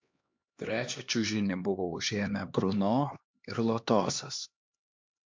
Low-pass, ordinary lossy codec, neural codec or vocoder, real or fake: 7.2 kHz; MP3, 64 kbps; codec, 16 kHz, 2 kbps, X-Codec, HuBERT features, trained on LibriSpeech; fake